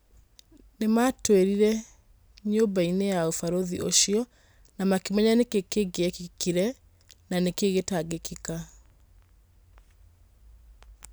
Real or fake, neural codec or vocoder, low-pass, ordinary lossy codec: real; none; none; none